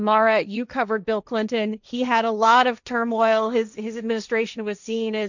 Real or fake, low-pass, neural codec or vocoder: fake; 7.2 kHz; codec, 16 kHz, 1.1 kbps, Voila-Tokenizer